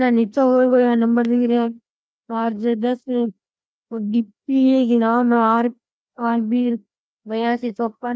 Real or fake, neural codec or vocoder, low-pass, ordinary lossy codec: fake; codec, 16 kHz, 1 kbps, FreqCodec, larger model; none; none